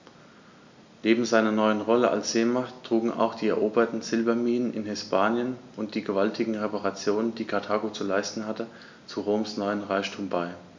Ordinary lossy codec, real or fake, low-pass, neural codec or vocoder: MP3, 64 kbps; real; 7.2 kHz; none